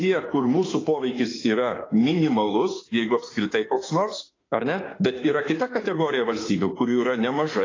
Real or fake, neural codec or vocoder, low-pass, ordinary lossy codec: fake; autoencoder, 48 kHz, 32 numbers a frame, DAC-VAE, trained on Japanese speech; 7.2 kHz; AAC, 32 kbps